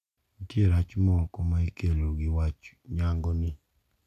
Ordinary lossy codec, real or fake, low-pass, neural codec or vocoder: none; real; 14.4 kHz; none